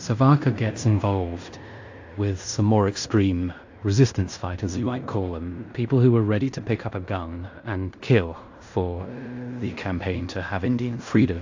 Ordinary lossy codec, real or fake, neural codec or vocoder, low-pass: AAC, 48 kbps; fake; codec, 16 kHz in and 24 kHz out, 0.9 kbps, LongCat-Audio-Codec, fine tuned four codebook decoder; 7.2 kHz